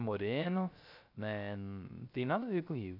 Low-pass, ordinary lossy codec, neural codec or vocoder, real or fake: 5.4 kHz; none; codec, 16 kHz, 0.3 kbps, FocalCodec; fake